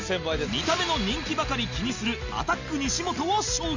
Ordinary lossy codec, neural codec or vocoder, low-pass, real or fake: Opus, 64 kbps; none; 7.2 kHz; real